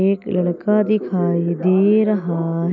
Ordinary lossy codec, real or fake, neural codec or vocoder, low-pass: none; real; none; 7.2 kHz